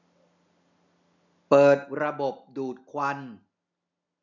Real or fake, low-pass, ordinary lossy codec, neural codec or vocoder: real; 7.2 kHz; none; none